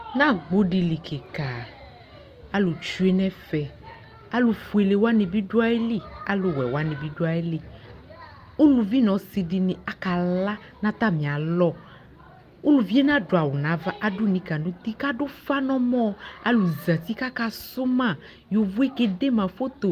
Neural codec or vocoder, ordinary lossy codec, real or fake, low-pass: none; Opus, 32 kbps; real; 14.4 kHz